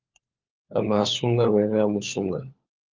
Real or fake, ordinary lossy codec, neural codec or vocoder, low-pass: fake; Opus, 24 kbps; codec, 16 kHz, 4 kbps, FunCodec, trained on LibriTTS, 50 frames a second; 7.2 kHz